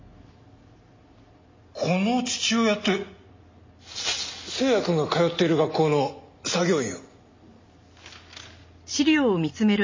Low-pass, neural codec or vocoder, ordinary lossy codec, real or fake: 7.2 kHz; none; MP3, 32 kbps; real